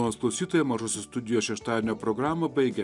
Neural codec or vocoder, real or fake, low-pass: none; real; 10.8 kHz